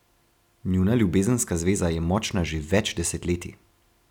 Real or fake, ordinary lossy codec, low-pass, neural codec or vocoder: real; none; 19.8 kHz; none